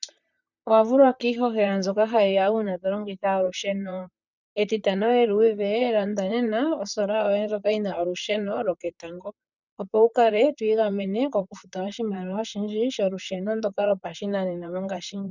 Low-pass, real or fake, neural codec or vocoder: 7.2 kHz; fake; vocoder, 44.1 kHz, 128 mel bands, Pupu-Vocoder